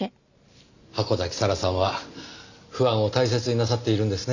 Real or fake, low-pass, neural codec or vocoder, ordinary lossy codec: real; 7.2 kHz; none; none